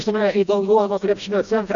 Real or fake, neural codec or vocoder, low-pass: fake; codec, 16 kHz, 1 kbps, FreqCodec, smaller model; 7.2 kHz